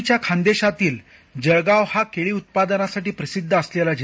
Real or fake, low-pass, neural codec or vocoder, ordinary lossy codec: real; none; none; none